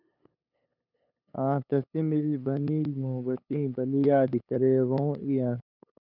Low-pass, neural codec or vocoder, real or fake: 5.4 kHz; codec, 16 kHz, 8 kbps, FunCodec, trained on LibriTTS, 25 frames a second; fake